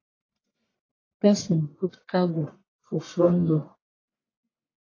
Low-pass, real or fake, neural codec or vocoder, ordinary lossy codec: 7.2 kHz; fake; codec, 44.1 kHz, 1.7 kbps, Pupu-Codec; AAC, 32 kbps